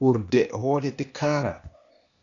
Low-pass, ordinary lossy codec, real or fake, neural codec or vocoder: 7.2 kHz; MP3, 96 kbps; fake; codec, 16 kHz, 0.8 kbps, ZipCodec